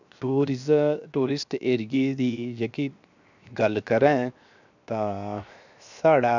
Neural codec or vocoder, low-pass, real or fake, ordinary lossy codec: codec, 16 kHz, 0.7 kbps, FocalCodec; 7.2 kHz; fake; none